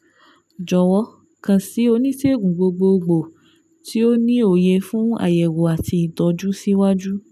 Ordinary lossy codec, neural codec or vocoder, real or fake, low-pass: none; none; real; 14.4 kHz